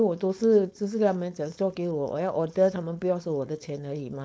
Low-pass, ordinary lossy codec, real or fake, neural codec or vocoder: none; none; fake; codec, 16 kHz, 4.8 kbps, FACodec